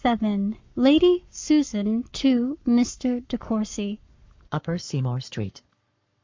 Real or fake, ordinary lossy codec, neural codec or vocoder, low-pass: fake; MP3, 64 kbps; codec, 44.1 kHz, 7.8 kbps, Pupu-Codec; 7.2 kHz